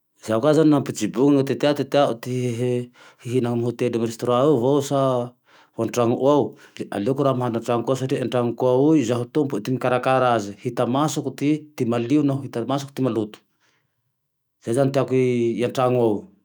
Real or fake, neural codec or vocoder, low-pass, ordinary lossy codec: fake; autoencoder, 48 kHz, 128 numbers a frame, DAC-VAE, trained on Japanese speech; none; none